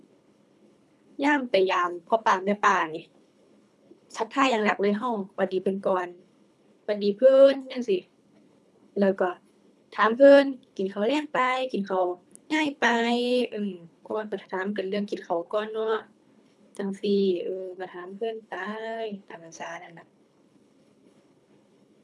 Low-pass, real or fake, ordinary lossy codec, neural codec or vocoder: none; fake; none; codec, 24 kHz, 3 kbps, HILCodec